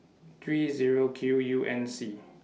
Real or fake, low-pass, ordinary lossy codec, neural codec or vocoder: real; none; none; none